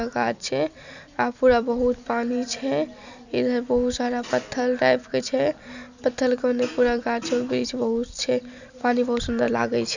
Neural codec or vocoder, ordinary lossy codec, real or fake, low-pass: none; none; real; 7.2 kHz